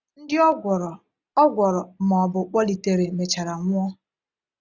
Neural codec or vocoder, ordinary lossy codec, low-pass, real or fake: none; none; 7.2 kHz; real